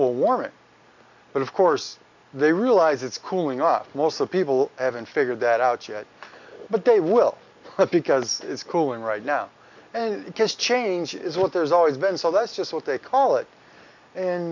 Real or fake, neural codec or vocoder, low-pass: real; none; 7.2 kHz